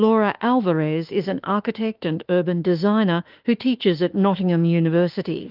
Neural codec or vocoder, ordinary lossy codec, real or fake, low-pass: autoencoder, 48 kHz, 32 numbers a frame, DAC-VAE, trained on Japanese speech; Opus, 32 kbps; fake; 5.4 kHz